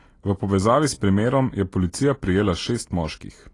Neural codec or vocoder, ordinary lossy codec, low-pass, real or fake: none; AAC, 32 kbps; 10.8 kHz; real